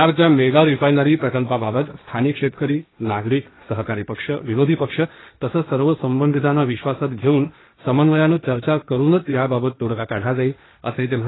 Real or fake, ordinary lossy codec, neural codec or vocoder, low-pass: fake; AAC, 16 kbps; codec, 16 kHz, 1.1 kbps, Voila-Tokenizer; 7.2 kHz